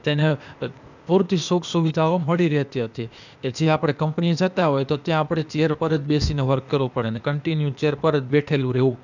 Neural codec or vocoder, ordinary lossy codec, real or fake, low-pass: codec, 16 kHz, 0.8 kbps, ZipCodec; none; fake; 7.2 kHz